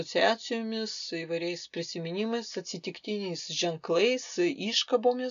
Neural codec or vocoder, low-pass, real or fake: none; 7.2 kHz; real